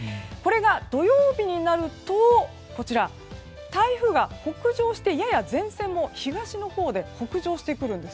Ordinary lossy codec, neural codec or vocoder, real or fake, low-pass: none; none; real; none